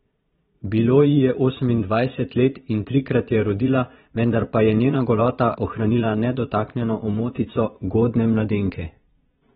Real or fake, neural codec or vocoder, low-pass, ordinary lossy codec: fake; vocoder, 22.05 kHz, 80 mel bands, Vocos; 9.9 kHz; AAC, 16 kbps